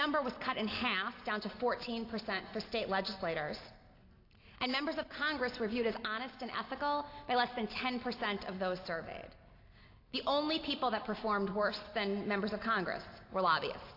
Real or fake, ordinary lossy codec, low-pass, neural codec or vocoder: real; AAC, 32 kbps; 5.4 kHz; none